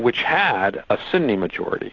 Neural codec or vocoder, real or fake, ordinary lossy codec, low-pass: none; real; AAC, 48 kbps; 7.2 kHz